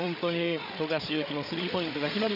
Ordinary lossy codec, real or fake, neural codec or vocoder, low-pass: none; fake; codec, 16 kHz, 8 kbps, FreqCodec, larger model; 5.4 kHz